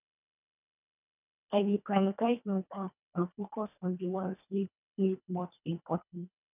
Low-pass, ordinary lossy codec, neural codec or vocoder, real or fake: 3.6 kHz; none; codec, 24 kHz, 1.5 kbps, HILCodec; fake